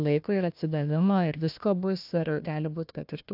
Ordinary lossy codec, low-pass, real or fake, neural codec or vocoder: AAC, 48 kbps; 5.4 kHz; fake; codec, 16 kHz, 1 kbps, FunCodec, trained on Chinese and English, 50 frames a second